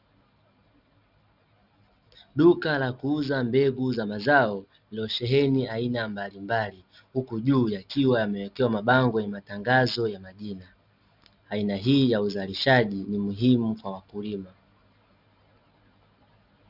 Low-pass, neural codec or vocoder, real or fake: 5.4 kHz; none; real